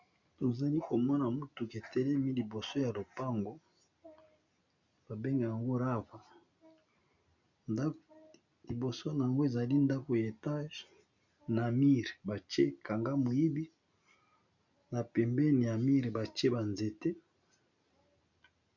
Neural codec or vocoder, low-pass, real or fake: none; 7.2 kHz; real